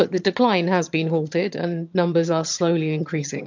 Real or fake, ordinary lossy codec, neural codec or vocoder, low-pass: fake; MP3, 64 kbps; vocoder, 22.05 kHz, 80 mel bands, HiFi-GAN; 7.2 kHz